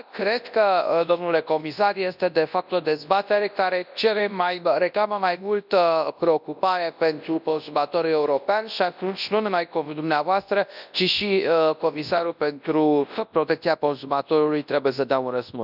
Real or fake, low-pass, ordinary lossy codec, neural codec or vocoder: fake; 5.4 kHz; none; codec, 24 kHz, 0.9 kbps, WavTokenizer, large speech release